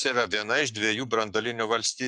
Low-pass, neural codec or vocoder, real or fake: 10.8 kHz; codec, 44.1 kHz, 7.8 kbps, Pupu-Codec; fake